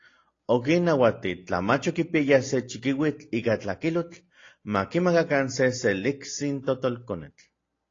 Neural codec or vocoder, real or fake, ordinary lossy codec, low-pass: none; real; AAC, 32 kbps; 7.2 kHz